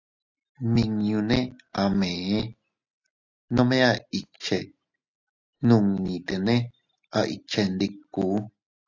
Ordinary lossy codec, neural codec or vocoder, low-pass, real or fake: AAC, 48 kbps; none; 7.2 kHz; real